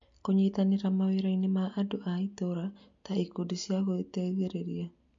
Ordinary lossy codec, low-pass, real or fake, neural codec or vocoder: MP3, 48 kbps; 7.2 kHz; real; none